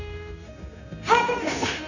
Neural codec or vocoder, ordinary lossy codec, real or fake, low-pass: codec, 16 kHz, 0.9 kbps, LongCat-Audio-Codec; none; fake; 7.2 kHz